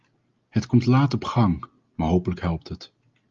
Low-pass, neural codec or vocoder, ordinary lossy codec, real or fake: 7.2 kHz; none; Opus, 32 kbps; real